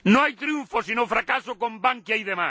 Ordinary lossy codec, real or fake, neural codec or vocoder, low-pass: none; real; none; none